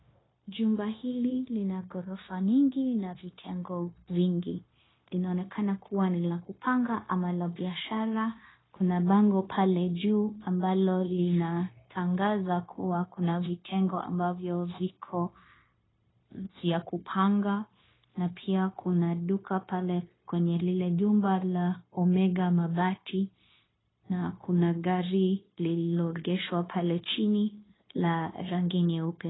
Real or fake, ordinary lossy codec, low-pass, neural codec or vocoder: fake; AAC, 16 kbps; 7.2 kHz; codec, 16 kHz, 0.9 kbps, LongCat-Audio-Codec